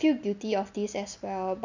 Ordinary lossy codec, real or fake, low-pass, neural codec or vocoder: none; real; 7.2 kHz; none